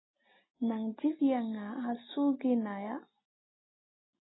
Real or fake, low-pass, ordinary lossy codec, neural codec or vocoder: real; 7.2 kHz; AAC, 16 kbps; none